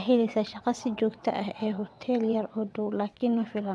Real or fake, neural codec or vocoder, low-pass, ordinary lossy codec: fake; vocoder, 22.05 kHz, 80 mel bands, WaveNeXt; none; none